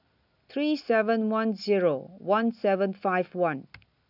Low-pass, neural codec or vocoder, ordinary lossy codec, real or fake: 5.4 kHz; none; none; real